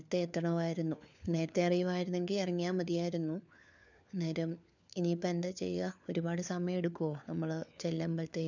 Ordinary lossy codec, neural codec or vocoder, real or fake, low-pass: none; codec, 16 kHz, 4 kbps, FunCodec, trained on LibriTTS, 50 frames a second; fake; 7.2 kHz